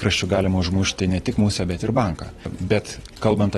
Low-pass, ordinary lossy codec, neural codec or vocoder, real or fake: 19.8 kHz; AAC, 32 kbps; vocoder, 44.1 kHz, 128 mel bands every 256 samples, BigVGAN v2; fake